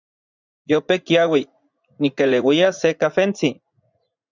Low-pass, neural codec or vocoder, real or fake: 7.2 kHz; vocoder, 44.1 kHz, 80 mel bands, Vocos; fake